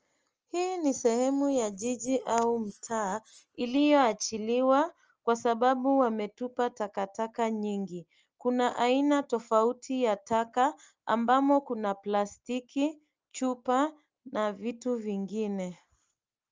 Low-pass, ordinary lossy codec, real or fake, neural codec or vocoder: 7.2 kHz; Opus, 24 kbps; real; none